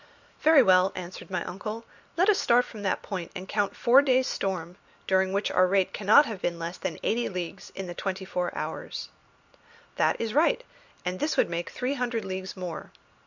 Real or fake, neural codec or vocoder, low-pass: real; none; 7.2 kHz